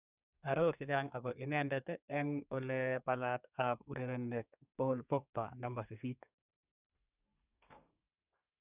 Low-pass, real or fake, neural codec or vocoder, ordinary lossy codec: 3.6 kHz; fake; codec, 32 kHz, 1.9 kbps, SNAC; none